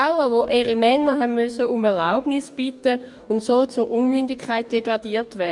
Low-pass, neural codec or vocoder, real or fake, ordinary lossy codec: 10.8 kHz; codec, 44.1 kHz, 2.6 kbps, DAC; fake; MP3, 96 kbps